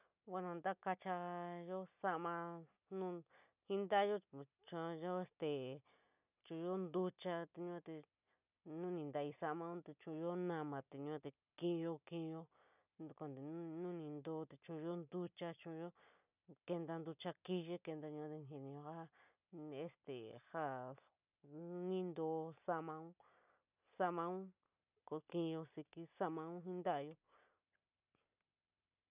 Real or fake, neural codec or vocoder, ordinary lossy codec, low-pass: real; none; none; 3.6 kHz